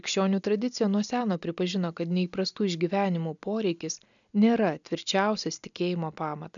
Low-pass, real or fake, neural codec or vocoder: 7.2 kHz; real; none